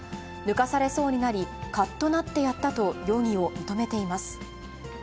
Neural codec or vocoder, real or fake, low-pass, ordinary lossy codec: none; real; none; none